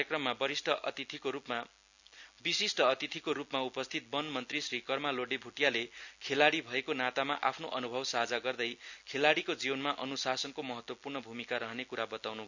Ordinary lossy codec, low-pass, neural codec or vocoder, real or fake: none; 7.2 kHz; none; real